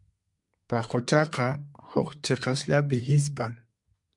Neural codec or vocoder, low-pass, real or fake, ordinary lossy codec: codec, 24 kHz, 1 kbps, SNAC; 10.8 kHz; fake; MP3, 64 kbps